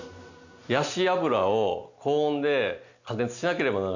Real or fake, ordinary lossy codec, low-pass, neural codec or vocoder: real; none; 7.2 kHz; none